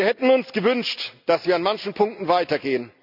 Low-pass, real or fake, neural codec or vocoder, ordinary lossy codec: 5.4 kHz; real; none; none